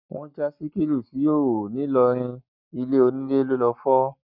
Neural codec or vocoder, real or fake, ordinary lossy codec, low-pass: codec, 44.1 kHz, 7.8 kbps, Pupu-Codec; fake; none; 5.4 kHz